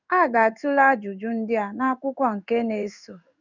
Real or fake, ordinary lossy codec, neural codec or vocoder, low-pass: fake; none; codec, 16 kHz in and 24 kHz out, 1 kbps, XY-Tokenizer; 7.2 kHz